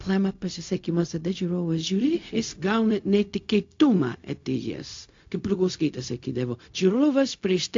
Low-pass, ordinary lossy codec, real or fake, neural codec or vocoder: 7.2 kHz; AAC, 48 kbps; fake; codec, 16 kHz, 0.4 kbps, LongCat-Audio-Codec